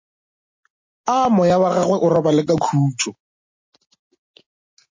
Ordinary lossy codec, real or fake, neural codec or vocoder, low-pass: MP3, 32 kbps; real; none; 7.2 kHz